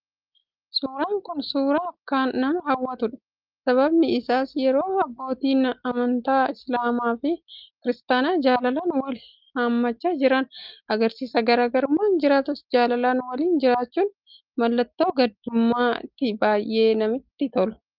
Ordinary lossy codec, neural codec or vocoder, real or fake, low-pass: Opus, 32 kbps; none; real; 5.4 kHz